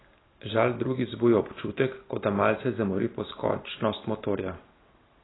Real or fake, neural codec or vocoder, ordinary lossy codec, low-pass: real; none; AAC, 16 kbps; 7.2 kHz